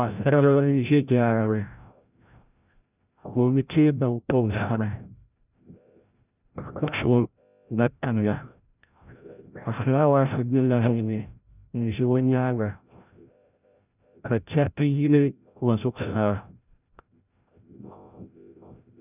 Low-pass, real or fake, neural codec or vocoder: 3.6 kHz; fake; codec, 16 kHz, 0.5 kbps, FreqCodec, larger model